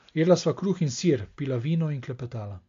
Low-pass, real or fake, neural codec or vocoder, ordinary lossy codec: 7.2 kHz; real; none; MP3, 48 kbps